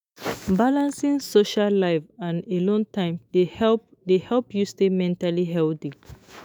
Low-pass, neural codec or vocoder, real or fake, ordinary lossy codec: none; autoencoder, 48 kHz, 128 numbers a frame, DAC-VAE, trained on Japanese speech; fake; none